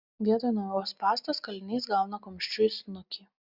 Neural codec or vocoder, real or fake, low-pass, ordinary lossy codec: none; real; 5.4 kHz; Opus, 64 kbps